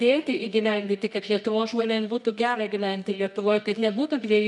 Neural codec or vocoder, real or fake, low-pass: codec, 24 kHz, 0.9 kbps, WavTokenizer, medium music audio release; fake; 10.8 kHz